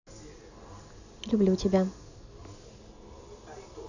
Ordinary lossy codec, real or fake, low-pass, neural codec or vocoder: none; real; 7.2 kHz; none